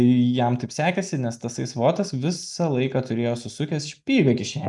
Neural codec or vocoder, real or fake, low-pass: none; real; 10.8 kHz